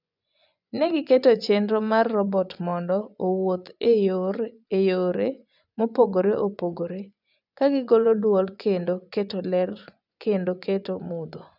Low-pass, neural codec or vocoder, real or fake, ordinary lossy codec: 5.4 kHz; vocoder, 24 kHz, 100 mel bands, Vocos; fake; none